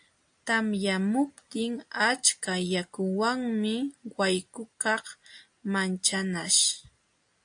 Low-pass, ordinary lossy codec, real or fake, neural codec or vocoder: 9.9 kHz; AAC, 64 kbps; real; none